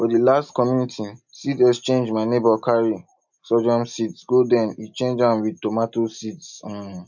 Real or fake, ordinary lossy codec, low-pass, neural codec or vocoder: real; none; 7.2 kHz; none